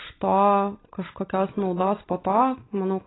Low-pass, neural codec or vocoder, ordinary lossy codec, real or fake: 7.2 kHz; none; AAC, 16 kbps; real